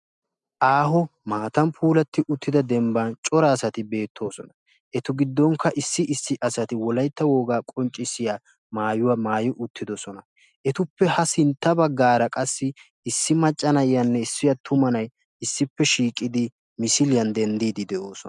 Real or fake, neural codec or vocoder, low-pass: real; none; 10.8 kHz